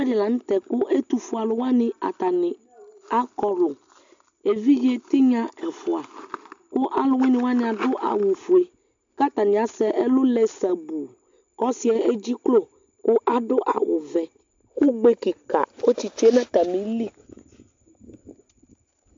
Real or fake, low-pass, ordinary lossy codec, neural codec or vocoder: real; 7.2 kHz; MP3, 64 kbps; none